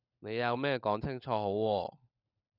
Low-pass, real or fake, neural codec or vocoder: 5.4 kHz; fake; codec, 16 kHz, 16 kbps, FunCodec, trained on LibriTTS, 50 frames a second